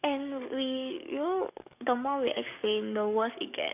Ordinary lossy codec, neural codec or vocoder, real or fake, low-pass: none; codec, 44.1 kHz, 7.8 kbps, DAC; fake; 3.6 kHz